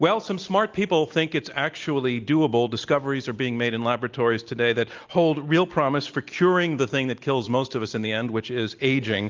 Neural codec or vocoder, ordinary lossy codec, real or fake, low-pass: none; Opus, 24 kbps; real; 7.2 kHz